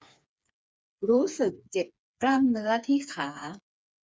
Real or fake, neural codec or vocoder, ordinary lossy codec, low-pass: fake; codec, 16 kHz, 4 kbps, FreqCodec, smaller model; none; none